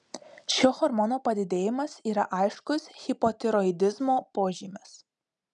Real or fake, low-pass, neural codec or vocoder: real; 10.8 kHz; none